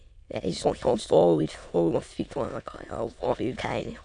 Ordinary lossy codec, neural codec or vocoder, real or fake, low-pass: none; autoencoder, 22.05 kHz, a latent of 192 numbers a frame, VITS, trained on many speakers; fake; 9.9 kHz